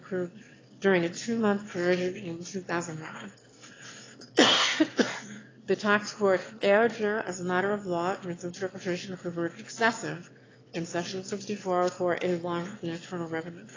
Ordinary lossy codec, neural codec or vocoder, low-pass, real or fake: AAC, 32 kbps; autoencoder, 22.05 kHz, a latent of 192 numbers a frame, VITS, trained on one speaker; 7.2 kHz; fake